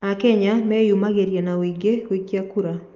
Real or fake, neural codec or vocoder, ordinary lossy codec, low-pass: real; none; Opus, 24 kbps; 7.2 kHz